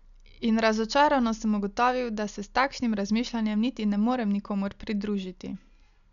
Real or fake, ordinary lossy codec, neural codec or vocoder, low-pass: real; none; none; 7.2 kHz